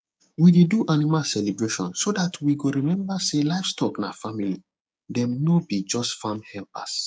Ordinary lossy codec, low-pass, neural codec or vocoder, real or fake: none; none; codec, 16 kHz, 6 kbps, DAC; fake